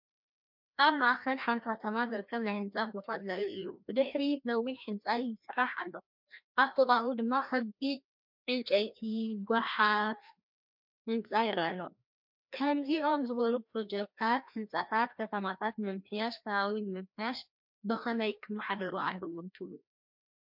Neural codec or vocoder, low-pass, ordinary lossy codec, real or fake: codec, 16 kHz, 1 kbps, FreqCodec, larger model; 5.4 kHz; MP3, 48 kbps; fake